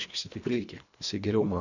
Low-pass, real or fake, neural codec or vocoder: 7.2 kHz; fake; codec, 24 kHz, 1.5 kbps, HILCodec